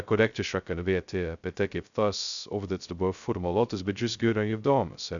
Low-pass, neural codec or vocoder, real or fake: 7.2 kHz; codec, 16 kHz, 0.2 kbps, FocalCodec; fake